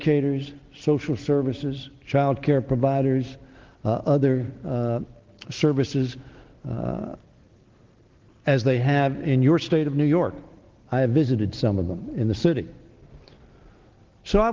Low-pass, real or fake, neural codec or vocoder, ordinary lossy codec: 7.2 kHz; real; none; Opus, 16 kbps